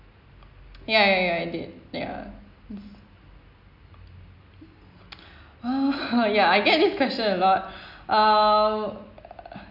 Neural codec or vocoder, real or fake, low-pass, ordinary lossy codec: none; real; 5.4 kHz; none